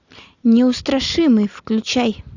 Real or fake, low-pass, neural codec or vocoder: real; 7.2 kHz; none